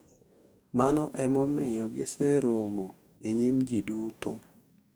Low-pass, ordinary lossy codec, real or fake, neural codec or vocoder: none; none; fake; codec, 44.1 kHz, 2.6 kbps, DAC